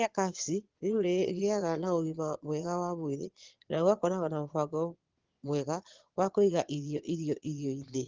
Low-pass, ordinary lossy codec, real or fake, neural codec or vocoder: 7.2 kHz; Opus, 16 kbps; fake; vocoder, 22.05 kHz, 80 mel bands, Vocos